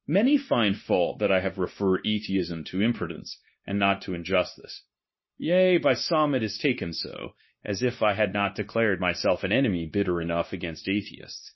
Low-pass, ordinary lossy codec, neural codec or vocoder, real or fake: 7.2 kHz; MP3, 24 kbps; codec, 16 kHz, 0.9 kbps, LongCat-Audio-Codec; fake